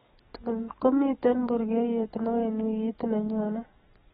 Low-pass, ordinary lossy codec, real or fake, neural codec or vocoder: 19.8 kHz; AAC, 16 kbps; fake; codec, 44.1 kHz, 7.8 kbps, DAC